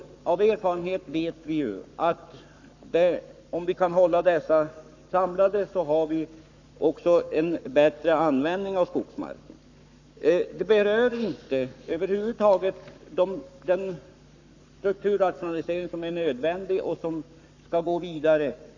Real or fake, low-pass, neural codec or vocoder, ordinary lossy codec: fake; 7.2 kHz; codec, 44.1 kHz, 7.8 kbps, Pupu-Codec; none